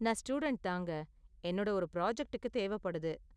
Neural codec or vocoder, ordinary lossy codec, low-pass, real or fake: none; none; none; real